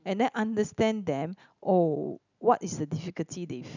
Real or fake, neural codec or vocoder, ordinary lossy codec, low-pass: real; none; none; 7.2 kHz